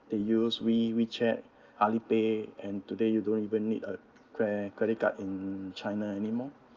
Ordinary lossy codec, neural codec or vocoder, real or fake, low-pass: Opus, 24 kbps; none; real; 7.2 kHz